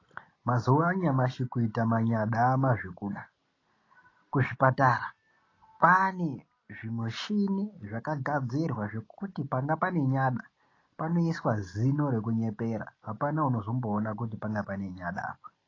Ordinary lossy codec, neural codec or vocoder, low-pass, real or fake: AAC, 32 kbps; none; 7.2 kHz; real